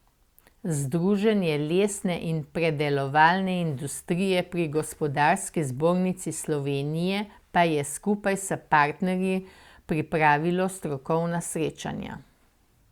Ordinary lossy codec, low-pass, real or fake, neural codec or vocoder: Opus, 64 kbps; 19.8 kHz; real; none